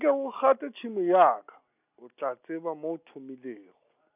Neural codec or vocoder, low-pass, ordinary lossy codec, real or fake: none; 3.6 kHz; none; real